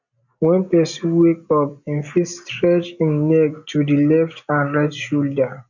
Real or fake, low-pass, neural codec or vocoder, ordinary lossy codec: real; 7.2 kHz; none; none